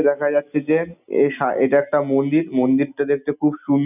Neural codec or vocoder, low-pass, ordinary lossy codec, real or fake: none; 3.6 kHz; none; real